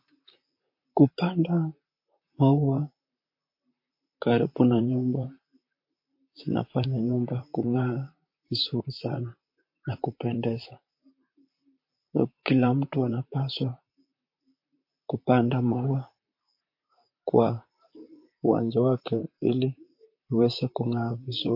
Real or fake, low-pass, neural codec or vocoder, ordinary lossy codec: fake; 5.4 kHz; vocoder, 44.1 kHz, 128 mel bands, Pupu-Vocoder; MP3, 32 kbps